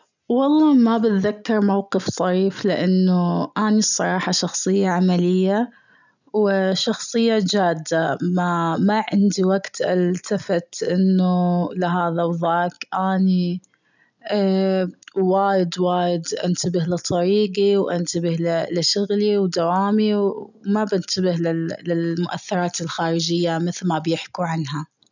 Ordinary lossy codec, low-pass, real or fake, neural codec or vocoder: none; 7.2 kHz; real; none